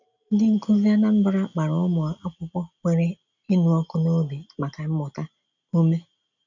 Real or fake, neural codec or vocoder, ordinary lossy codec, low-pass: real; none; none; 7.2 kHz